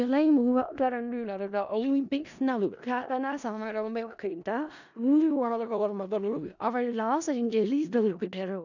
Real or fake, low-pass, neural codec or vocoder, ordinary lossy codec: fake; 7.2 kHz; codec, 16 kHz in and 24 kHz out, 0.4 kbps, LongCat-Audio-Codec, four codebook decoder; none